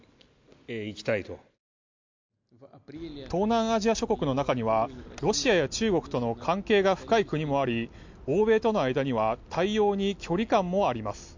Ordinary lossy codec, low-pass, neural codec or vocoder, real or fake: MP3, 64 kbps; 7.2 kHz; none; real